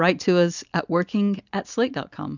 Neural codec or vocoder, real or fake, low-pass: none; real; 7.2 kHz